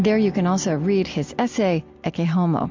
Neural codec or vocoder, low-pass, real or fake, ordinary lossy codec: none; 7.2 kHz; real; MP3, 64 kbps